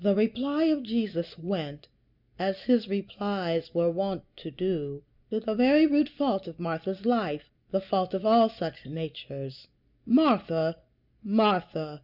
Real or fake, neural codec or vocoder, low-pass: real; none; 5.4 kHz